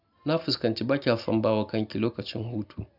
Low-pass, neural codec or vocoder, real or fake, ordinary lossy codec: 5.4 kHz; none; real; none